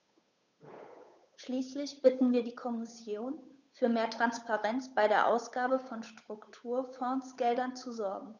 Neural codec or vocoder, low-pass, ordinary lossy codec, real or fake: codec, 16 kHz, 8 kbps, FunCodec, trained on Chinese and English, 25 frames a second; 7.2 kHz; none; fake